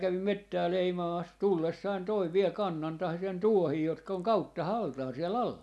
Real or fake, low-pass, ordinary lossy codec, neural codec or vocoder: real; none; none; none